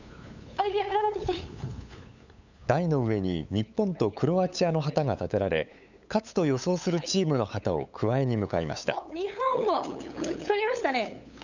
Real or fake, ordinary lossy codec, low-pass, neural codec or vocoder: fake; none; 7.2 kHz; codec, 16 kHz, 8 kbps, FunCodec, trained on LibriTTS, 25 frames a second